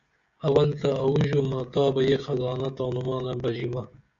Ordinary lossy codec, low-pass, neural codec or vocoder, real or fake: Opus, 64 kbps; 7.2 kHz; codec, 16 kHz, 16 kbps, FreqCodec, smaller model; fake